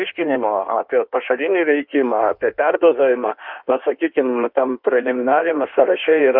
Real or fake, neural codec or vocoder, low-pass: fake; codec, 16 kHz in and 24 kHz out, 1.1 kbps, FireRedTTS-2 codec; 5.4 kHz